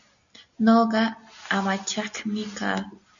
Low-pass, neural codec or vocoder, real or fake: 7.2 kHz; none; real